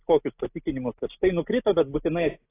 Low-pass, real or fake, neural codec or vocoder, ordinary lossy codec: 3.6 kHz; real; none; AAC, 16 kbps